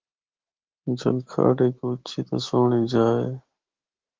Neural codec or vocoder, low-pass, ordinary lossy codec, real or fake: autoencoder, 48 kHz, 128 numbers a frame, DAC-VAE, trained on Japanese speech; 7.2 kHz; Opus, 16 kbps; fake